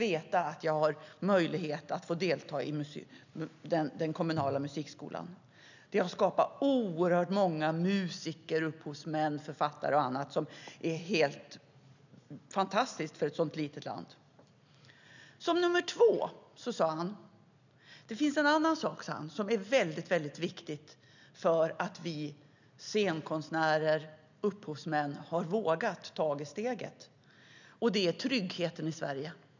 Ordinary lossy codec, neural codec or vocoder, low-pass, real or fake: none; none; 7.2 kHz; real